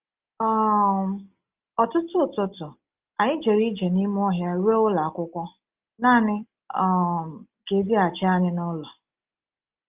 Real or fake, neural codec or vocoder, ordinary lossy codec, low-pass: real; none; Opus, 32 kbps; 3.6 kHz